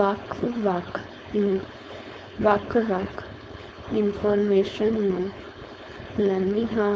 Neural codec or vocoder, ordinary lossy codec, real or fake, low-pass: codec, 16 kHz, 4.8 kbps, FACodec; none; fake; none